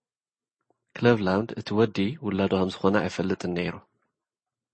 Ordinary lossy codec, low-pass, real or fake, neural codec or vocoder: MP3, 32 kbps; 9.9 kHz; real; none